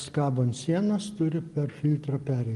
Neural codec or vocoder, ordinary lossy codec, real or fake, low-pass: none; Opus, 24 kbps; real; 10.8 kHz